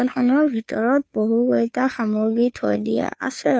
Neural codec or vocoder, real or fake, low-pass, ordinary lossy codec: codec, 16 kHz, 2 kbps, FunCodec, trained on Chinese and English, 25 frames a second; fake; none; none